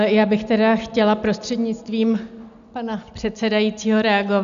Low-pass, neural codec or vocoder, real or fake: 7.2 kHz; none; real